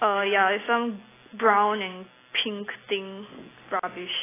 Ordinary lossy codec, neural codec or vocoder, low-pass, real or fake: AAC, 16 kbps; none; 3.6 kHz; real